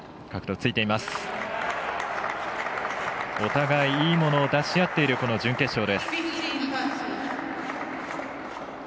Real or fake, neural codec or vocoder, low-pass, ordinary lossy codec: real; none; none; none